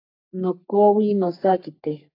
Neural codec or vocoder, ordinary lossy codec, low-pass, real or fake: codec, 44.1 kHz, 3.4 kbps, Pupu-Codec; AAC, 32 kbps; 5.4 kHz; fake